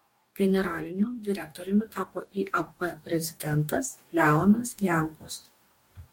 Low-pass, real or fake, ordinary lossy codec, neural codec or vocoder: 19.8 kHz; fake; MP3, 64 kbps; codec, 44.1 kHz, 2.6 kbps, DAC